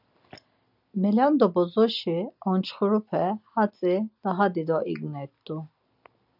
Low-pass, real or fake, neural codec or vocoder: 5.4 kHz; real; none